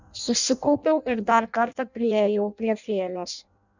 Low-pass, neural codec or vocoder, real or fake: 7.2 kHz; codec, 16 kHz in and 24 kHz out, 0.6 kbps, FireRedTTS-2 codec; fake